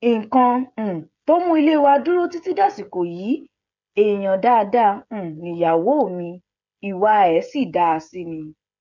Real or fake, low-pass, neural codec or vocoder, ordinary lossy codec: fake; 7.2 kHz; codec, 16 kHz, 16 kbps, FreqCodec, smaller model; none